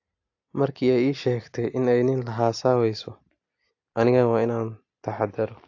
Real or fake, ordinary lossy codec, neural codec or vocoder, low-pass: real; AAC, 48 kbps; none; 7.2 kHz